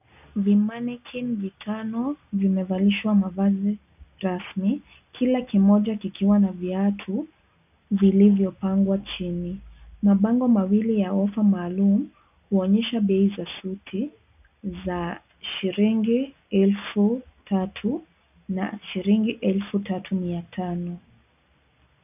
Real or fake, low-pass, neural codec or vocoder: real; 3.6 kHz; none